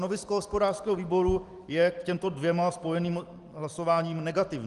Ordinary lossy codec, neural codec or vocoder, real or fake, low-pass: Opus, 24 kbps; none; real; 10.8 kHz